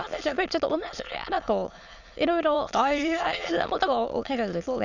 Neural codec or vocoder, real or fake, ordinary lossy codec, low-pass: autoencoder, 22.05 kHz, a latent of 192 numbers a frame, VITS, trained on many speakers; fake; none; 7.2 kHz